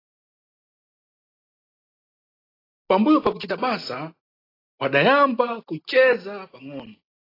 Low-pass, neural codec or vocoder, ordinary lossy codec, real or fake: 5.4 kHz; none; AAC, 24 kbps; real